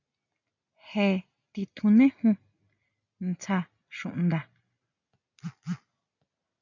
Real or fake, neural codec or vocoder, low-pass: real; none; 7.2 kHz